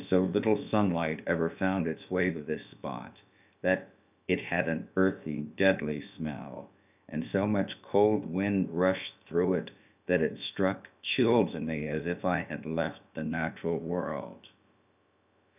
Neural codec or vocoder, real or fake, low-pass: codec, 16 kHz, about 1 kbps, DyCAST, with the encoder's durations; fake; 3.6 kHz